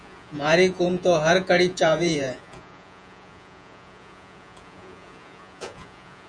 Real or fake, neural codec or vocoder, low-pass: fake; vocoder, 48 kHz, 128 mel bands, Vocos; 9.9 kHz